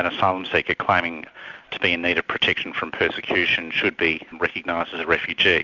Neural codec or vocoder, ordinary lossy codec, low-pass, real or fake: none; Opus, 64 kbps; 7.2 kHz; real